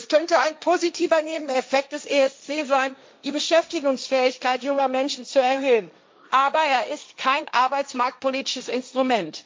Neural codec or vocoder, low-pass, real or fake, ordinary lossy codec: codec, 16 kHz, 1.1 kbps, Voila-Tokenizer; none; fake; none